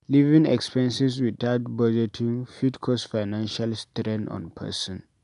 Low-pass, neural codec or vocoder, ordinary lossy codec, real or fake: 10.8 kHz; none; none; real